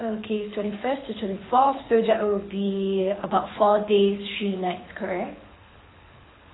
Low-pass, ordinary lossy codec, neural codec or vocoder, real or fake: 7.2 kHz; AAC, 16 kbps; codec, 24 kHz, 6 kbps, HILCodec; fake